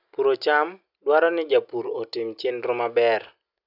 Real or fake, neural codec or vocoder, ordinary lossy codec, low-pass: real; none; none; 5.4 kHz